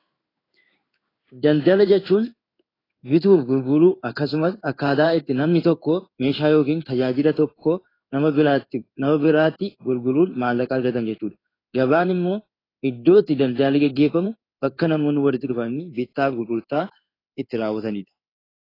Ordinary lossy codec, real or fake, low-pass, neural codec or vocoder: AAC, 24 kbps; fake; 5.4 kHz; codec, 16 kHz in and 24 kHz out, 1 kbps, XY-Tokenizer